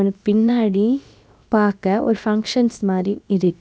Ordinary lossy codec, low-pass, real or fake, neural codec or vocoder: none; none; fake; codec, 16 kHz, about 1 kbps, DyCAST, with the encoder's durations